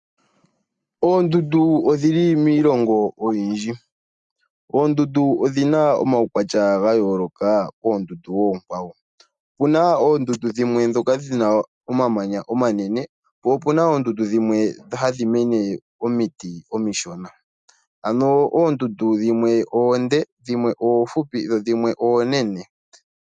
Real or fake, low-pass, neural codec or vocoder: real; 9.9 kHz; none